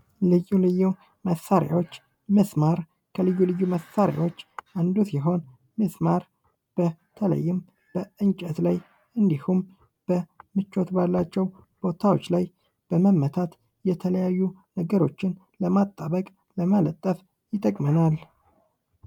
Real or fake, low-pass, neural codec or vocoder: real; 19.8 kHz; none